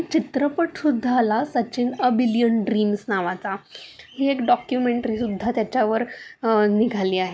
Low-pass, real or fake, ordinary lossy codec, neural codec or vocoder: none; real; none; none